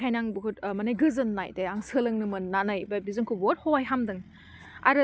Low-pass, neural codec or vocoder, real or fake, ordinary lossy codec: none; none; real; none